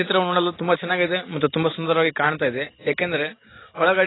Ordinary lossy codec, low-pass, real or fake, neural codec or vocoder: AAC, 16 kbps; 7.2 kHz; real; none